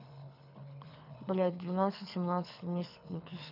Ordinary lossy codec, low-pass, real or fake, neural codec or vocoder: none; 5.4 kHz; fake; codec, 24 kHz, 6 kbps, HILCodec